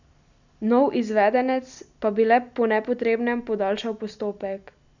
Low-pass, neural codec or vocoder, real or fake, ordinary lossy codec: 7.2 kHz; none; real; none